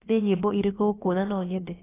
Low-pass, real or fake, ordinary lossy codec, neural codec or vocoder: 3.6 kHz; fake; AAC, 16 kbps; codec, 16 kHz, about 1 kbps, DyCAST, with the encoder's durations